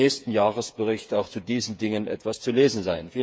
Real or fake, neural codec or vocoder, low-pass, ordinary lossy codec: fake; codec, 16 kHz, 8 kbps, FreqCodec, smaller model; none; none